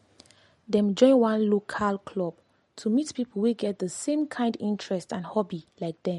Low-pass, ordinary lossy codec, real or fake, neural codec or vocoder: 19.8 kHz; MP3, 48 kbps; real; none